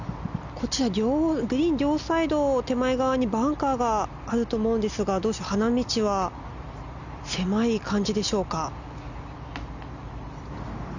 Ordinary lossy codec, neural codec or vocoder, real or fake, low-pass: none; none; real; 7.2 kHz